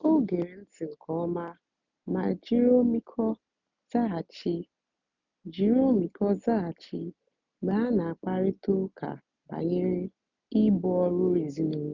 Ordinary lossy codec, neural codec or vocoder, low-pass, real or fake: none; none; 7.2 kHz; real